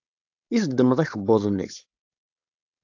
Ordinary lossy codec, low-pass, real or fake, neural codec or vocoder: AAC, 48 kbps; 7.2 kHz; fake; codec, 16 kHz, 4.8 kbps, FACodec